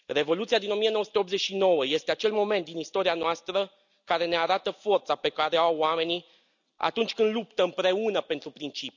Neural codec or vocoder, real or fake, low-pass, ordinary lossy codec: none; real; 7.2 kHz; none